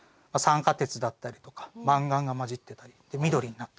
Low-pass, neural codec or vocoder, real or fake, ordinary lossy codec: none; none; real; none